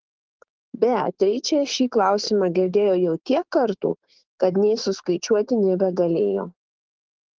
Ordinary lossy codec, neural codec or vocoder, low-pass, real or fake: Opus, 16 kbps; codec, 16 kHz, 6 kbps, DAC; 7.2 kHz; fake